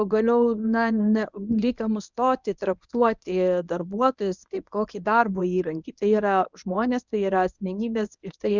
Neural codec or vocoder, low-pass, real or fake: codec, 24 kHz, 0.9 kbps, WavTokenizer, medium speech release version 1; 7.2 kHz; fake